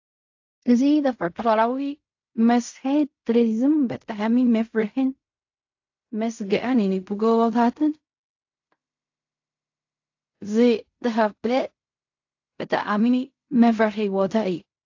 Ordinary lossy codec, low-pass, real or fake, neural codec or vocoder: AAC, 48 kbps; 7.2 kHz; fake; codec, 16 kHz in and 24 kHz out, 0.4 kbps, LongCat-Audio-Codec, fine tuned four codebook decoder